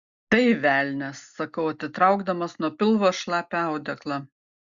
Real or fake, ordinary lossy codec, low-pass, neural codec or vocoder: real; Opus, 64 kbps; 7.2 kHz; none